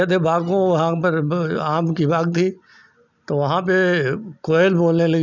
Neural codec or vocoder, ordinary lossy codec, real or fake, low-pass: none; none; real; 7.2 kHz